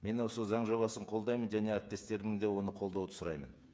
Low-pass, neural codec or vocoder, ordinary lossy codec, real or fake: none; codec, 16 kHz, 8 kbps, FreqCodec, smaller model; none; fake